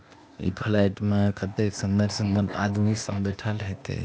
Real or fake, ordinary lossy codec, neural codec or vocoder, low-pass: fake; none; codec, 16 kHz, 0.8 kbps, ZipCodec; none